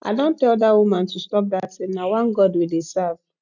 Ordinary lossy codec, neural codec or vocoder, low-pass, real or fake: none; none; 7.2 kHz; real